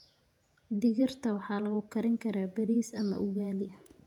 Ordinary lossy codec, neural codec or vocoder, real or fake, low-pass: none; vocoder, 48 kHz, 128 mel bands, Vocos; fake; 19.8 kHz